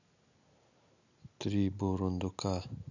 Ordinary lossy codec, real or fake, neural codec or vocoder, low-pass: none; real; none; 7.2 kHz